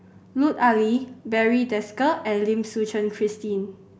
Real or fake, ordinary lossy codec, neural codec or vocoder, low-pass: real; none; none; none